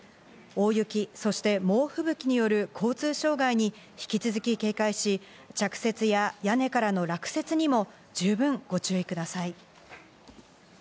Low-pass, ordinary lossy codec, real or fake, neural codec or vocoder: none; none; real; none